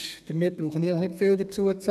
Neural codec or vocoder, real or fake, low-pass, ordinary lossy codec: codec, 32 kHz, 1.9 kbps, SNAC; fake; 14.4 kHz; none